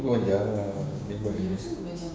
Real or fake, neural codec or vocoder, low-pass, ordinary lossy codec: fake; codec, 16 kHz, 6 kbps, DAC; none; none